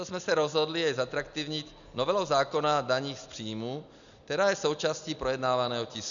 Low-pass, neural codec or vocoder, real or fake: 7.2 kHz; none; real